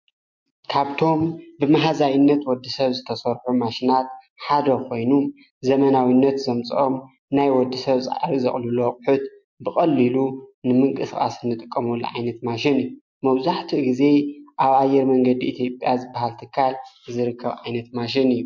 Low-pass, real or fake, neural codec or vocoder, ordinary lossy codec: 7.2 kHz; real; none; MP3, 48 kbps